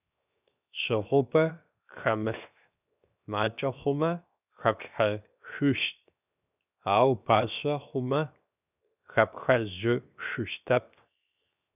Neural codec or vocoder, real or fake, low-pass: codec, 16 kHz, 0.7 kbps, FocalCodec; fake; 3.6 kHz